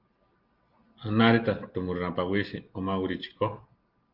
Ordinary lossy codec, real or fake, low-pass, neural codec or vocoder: Opus, 32 kbps; real; 5.4 kHz; none